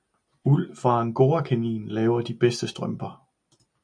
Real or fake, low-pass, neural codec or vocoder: real; 9.9 kHz; none